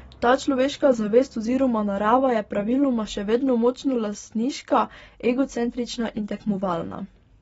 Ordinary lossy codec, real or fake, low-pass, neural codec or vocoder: AAC, 24 kbps; real; 19.8 kHz; none